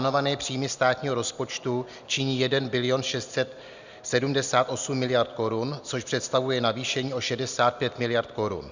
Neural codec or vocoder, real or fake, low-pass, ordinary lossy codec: none; real; 7.2 kHz; Opus, 64 kbps